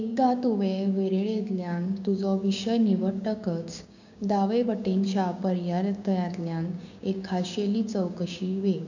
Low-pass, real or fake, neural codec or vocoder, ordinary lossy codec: 7.2 kHz; fake; codec, 16 kHz, 6 kbps, DAC; none